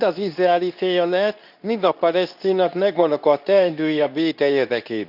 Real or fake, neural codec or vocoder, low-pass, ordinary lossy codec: fake; codec, 24 kHz, 0.9 kbps, WavTokenizer, medium speech release version 2; 5.4 kHz; none